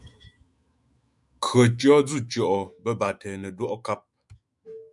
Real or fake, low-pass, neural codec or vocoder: fake; 10.8 kHz; autoencoder, 48 kHz, 128 numbers a frame, DAC-VAE, trained on Japanese speech